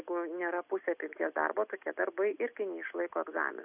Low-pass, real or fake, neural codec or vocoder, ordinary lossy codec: 3.6 kHz; real; none; AAC, 32 kbps